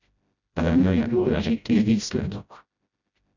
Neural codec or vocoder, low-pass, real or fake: codec, 16 kHz, 0.5 kbps, FreqCodec, smaller model; 7.2 kHz; fake